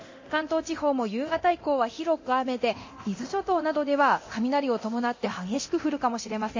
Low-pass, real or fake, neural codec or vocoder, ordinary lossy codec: 7.2 kHz; fake; codec, 24 kHz, 0.9 kbps, DualCodec; MP3, 32 kbps